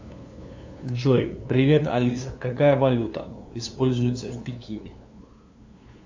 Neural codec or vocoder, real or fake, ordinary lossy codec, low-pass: codec, 16 kHz, 2 kbps, FunCodec, trained on LibriTTS, 25 frames a second; fake; AAC, 48 kbps; 7.2 kHz